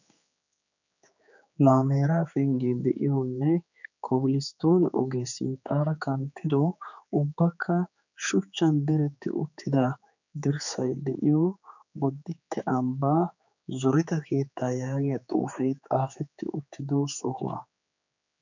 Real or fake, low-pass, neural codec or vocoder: fake; 7.2 kHz; codec, 16 kHz, 4 kbps, X-Codec, HuBERT features, trained on general audio